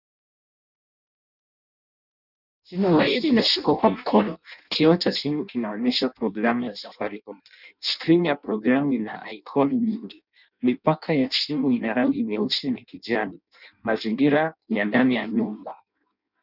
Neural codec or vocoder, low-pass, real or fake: codec, 16 kHz in and 24 kHz out, 0.6 kbps, FireRedTTS-2 codec; 5.4 kHz; fake